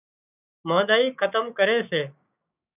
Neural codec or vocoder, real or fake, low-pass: codec, 16 kHz, 6 kbps, DAC; fake; 3.6 kHz